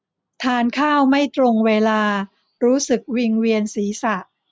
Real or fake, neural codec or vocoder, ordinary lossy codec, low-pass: real; none; none; none